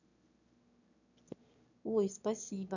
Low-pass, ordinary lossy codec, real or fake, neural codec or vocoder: 7.2 kHz; none; fake; autoencoder, 22.05 kHz, a latent of 192 numbers a frame, VITS, trained on one speaker